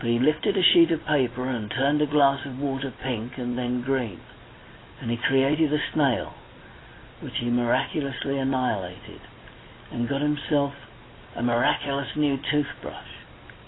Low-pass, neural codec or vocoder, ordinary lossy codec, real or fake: 7.2 kHz; none; AAC, 16 kbps; real